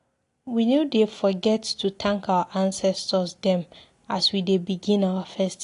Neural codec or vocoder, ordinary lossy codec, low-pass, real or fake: none; AAC, 48 kbps; 10.8 kHz; real